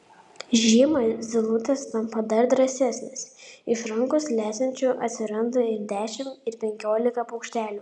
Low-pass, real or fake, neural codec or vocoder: 10.8 kHz; real; none